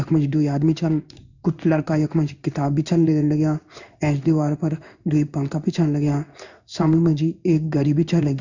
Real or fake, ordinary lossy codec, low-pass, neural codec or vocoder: fake; none; 7.2 kHz; codec, 16 kHz in and 24 kHz out, 1 kbps, XY-Tokenizer